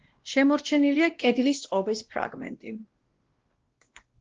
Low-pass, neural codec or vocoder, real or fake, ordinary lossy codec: 7.2 kHz; codec, 16 kHz, 1 kbps, X-Codec, WavLM features, trained on Multilingual LibriSpeech; fake; Opus, 16 kbps